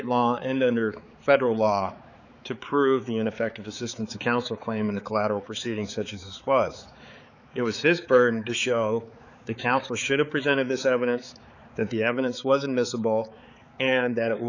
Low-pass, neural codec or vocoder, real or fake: 7.2 kHz; codec, 16 kHz, 4 kbps, X-Codec, HuBERT features, trained on balanced general audio; fake